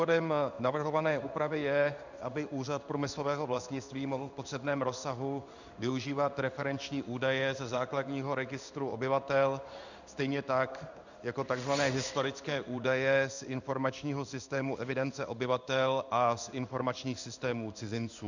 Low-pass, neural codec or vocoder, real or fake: 7.2 kHz; codec, 16 kHz in and 24 kHz out, 1 kbps, XY-Tokenizer; fake